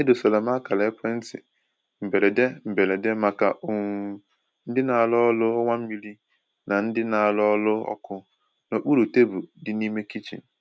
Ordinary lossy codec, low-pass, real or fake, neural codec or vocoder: none; none; real; none